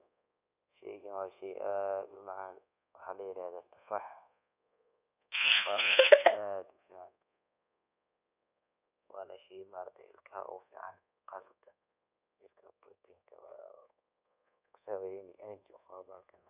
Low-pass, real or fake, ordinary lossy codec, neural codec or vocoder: 3.6 kHz; fake; none; codec, 24 kHz, 1.2 kbps, DualCodec